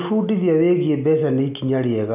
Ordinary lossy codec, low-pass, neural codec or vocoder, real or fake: none; 3.6 kHz; none; real